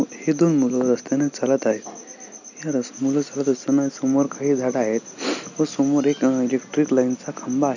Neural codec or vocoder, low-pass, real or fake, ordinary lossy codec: none; 7.2 kHz; real; none